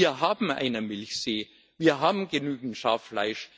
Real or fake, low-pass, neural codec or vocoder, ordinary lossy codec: real; none; none; none